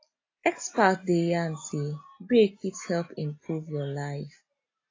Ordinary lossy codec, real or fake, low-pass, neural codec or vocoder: AAC, 32 kbps; real; 7.2 kHz; none